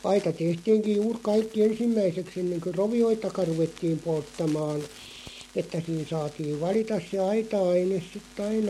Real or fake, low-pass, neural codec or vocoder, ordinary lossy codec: real; 19.8 kHz; none; MP3, 64 kbps